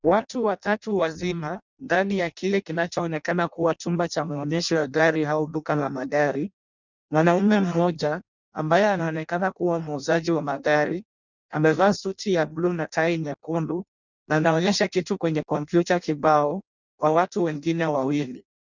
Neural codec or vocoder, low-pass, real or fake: codec, 16 kHz in and 24 kHz out, 0.6 kbps, FireRedTTS-2 codec; 7.2 kHz; fake